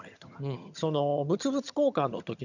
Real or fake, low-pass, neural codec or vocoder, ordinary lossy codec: fake; 7.2 kHz; vocoder, 22.05 kHz, 80 mel bands, HiFi-GAN; none